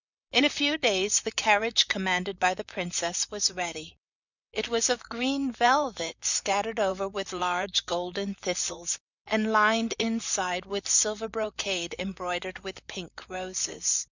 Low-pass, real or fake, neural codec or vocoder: 7.2 kHz; fake; vocoder, 44.1 kHz, 128 mel bands, Pupu-Vocoder